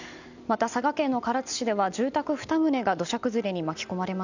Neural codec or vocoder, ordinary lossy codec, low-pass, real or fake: none; none; 7.2 kHz; real